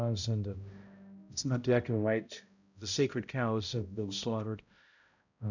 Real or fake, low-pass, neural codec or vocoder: fake; 7.2 kHz; codec, 16 kHz, 0.5 kbps, X-Codec, HuBERT features, trained on balanced general audio